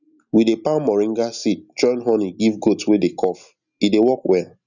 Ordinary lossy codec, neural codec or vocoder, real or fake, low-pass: none; none; real; 7.2 kHz